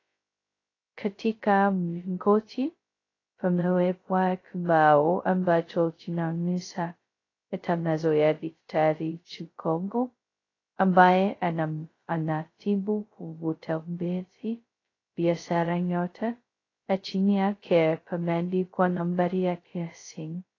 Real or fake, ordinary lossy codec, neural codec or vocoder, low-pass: fake; AAC, 32 kbps; codec, 16 kHz, 0.2 kbps, FocalCodec; 7.2 kHz